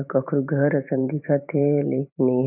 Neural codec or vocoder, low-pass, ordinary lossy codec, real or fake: vocoder, 44.1 kHz, 128 mel bands every 512 samples, BigVGAN v2; 3.6 kHz; none; fake